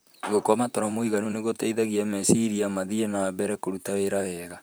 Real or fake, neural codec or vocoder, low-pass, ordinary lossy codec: fake; vocoder, 44.1 kHz, 128 mel bands, Pupu-Vocoder; none; none